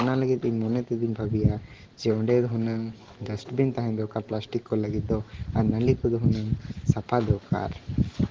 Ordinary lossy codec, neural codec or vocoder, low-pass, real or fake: Opus, 16 kbps; none; 7.2 kHz; real